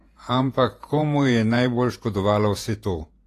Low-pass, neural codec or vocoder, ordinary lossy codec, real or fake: 14.4 kHz; vocoder, 48 kHz, 128 mel bands, Vocos; AAC, 48 kbps; fake